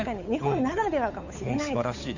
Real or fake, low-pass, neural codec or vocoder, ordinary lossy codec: fake; 7.2 kHz; codec, 16 kHz, 8 kbps, FunCodec, trained on Chinese and English, 25 frames a second; none